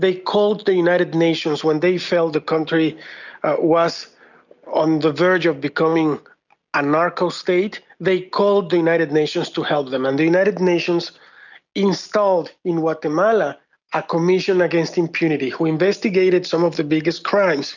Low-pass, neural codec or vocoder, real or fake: 7.2 kHz; none; real